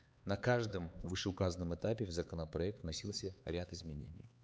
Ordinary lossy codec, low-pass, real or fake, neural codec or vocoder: none; none; fake; codec, 16 kHz, 4 kbps, X-Codec, HuBERT features, trained on LibriSpeech